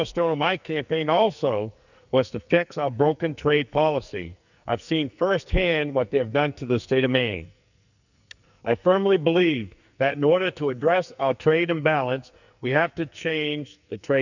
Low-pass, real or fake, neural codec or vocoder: 7.2 kHz; fake; codec, 44.1 kHz, 2.6 kbps, SNAC